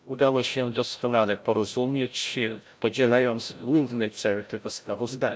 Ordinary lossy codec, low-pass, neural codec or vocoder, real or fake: none; none; codec, 16 kHz, 0.5 kbps, FreqCodec, larger model; fake